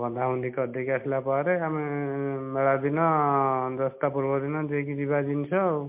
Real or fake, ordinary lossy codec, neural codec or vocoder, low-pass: real; MP3, 32 kbps; none; 3.6 kHz